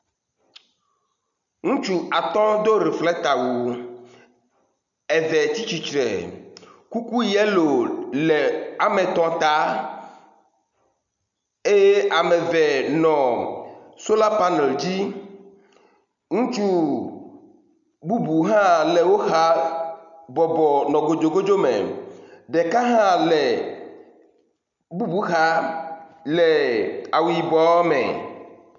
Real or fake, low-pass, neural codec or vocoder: real; 7.2 kHz; none